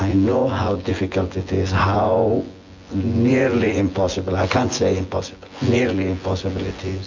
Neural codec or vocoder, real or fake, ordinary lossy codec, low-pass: vocoder, 24 kHz, 100 mel bands, Vocos; fake; MP3, 48 kbps; 7.2 kHz